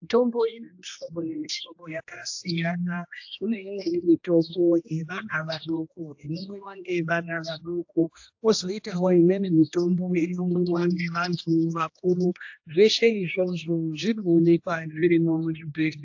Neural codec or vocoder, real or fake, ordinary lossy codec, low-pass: codec, 16 kHz, 1 kbps, X-Codec, HuBERT features, trained on general audio; fake; AAC, 48 kbps; 7.2 kHz